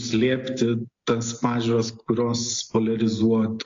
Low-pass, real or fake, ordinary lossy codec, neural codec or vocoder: 7.2 kHz; real; AAC, 48 kbps; none